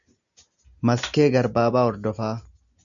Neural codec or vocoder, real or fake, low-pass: none; real; 7.2 kHz